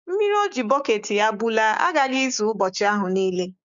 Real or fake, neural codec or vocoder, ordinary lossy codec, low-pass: fake; codec, 16 kHz, 6 kbps, DAC; MP3, 64 kbps; 7.2 kHz